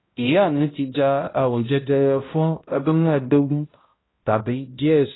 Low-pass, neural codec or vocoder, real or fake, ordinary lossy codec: 7.2 kHz; codec, 16 kHz, 0.5 kbps, X-Codec, HuBERT features, trained on balanced general audio; fake; AAC, 16 kbps